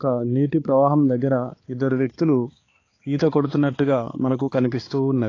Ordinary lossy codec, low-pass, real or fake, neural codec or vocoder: AAC, 32 kbps; 7.2 kHz; fake; codec, 16 kHz, 4 kbps, X-Codec, HuBERT features, trained on balanced general audio